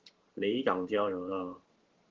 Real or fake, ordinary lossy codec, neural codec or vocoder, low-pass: fake; Opus, 16 kbps; vocoder, 44.1 kHz, 128 mel bands every 512 samples, BigVGAN v2; 7.2 kHz